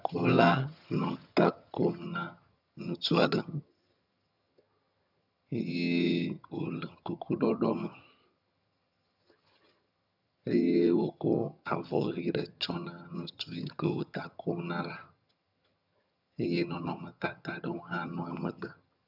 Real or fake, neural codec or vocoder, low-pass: fake; vocoder, 22.05 kHz, 80 mel bands, HiFi-GAN; 5.4 kHz